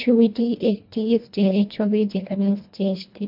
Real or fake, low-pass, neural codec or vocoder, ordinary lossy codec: fake; 5.4 kHz; codec, 24 kHz, 1.5 kbps, HILCodec; MP3, 48 kbps